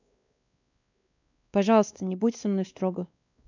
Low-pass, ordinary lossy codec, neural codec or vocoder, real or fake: 7.2 kHz; none; codec, 16 kHz, 2 kbps, X-Codec, WavLM features, trained on Multilingual LibriSpeech; fake